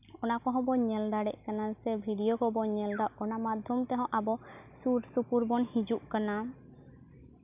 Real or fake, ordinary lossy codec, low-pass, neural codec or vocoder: real; none; 3.6 kHz; none